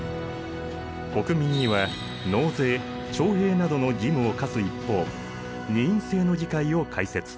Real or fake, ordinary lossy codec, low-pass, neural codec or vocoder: real; none; none; none